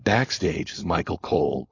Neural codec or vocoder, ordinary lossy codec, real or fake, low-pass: codec, 16 kHz, 4.8 kbps, FACodec; AAC, 32 kbps; fake; 7.2 kHz